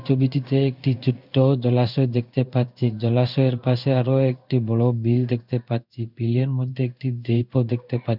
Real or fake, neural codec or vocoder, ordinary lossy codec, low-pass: fake; codec, 16 kHz in and 24 kHz out, 1 kbps, XY-Tokenizer; none; 5.4 kHz